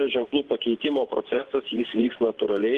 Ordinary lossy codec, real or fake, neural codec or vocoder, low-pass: Opus, 16 kbps; fake; vocoder, 24 kHz, 100 mel bands, Vocos; 10.8 kHz